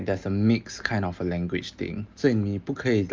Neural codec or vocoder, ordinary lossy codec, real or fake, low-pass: none; Opus, 32 kbps; real; 7.2 kHz